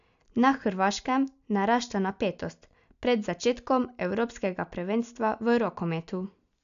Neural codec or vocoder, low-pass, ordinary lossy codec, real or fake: none; 7.2 kHz; none; real